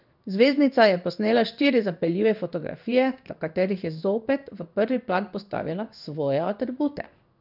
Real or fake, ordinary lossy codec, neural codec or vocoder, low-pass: fake; none; codec, 16 kHz in and 24 kHz out, 1 kbps, XY-Tokenizer; 5.4 kHz